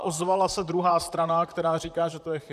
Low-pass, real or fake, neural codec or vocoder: 14.4 kHz; fake; vocoder, 44.1 kHz, 128 mel bands, Pupu-Vocoder